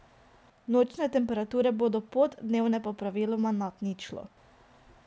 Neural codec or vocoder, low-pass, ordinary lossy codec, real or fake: none; none; none; real